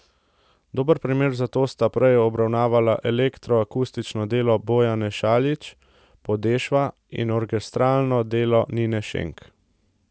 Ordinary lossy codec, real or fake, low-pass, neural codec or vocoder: none; real; none; none